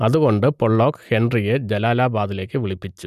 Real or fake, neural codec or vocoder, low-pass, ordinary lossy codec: real; none; 14.4 kHz; none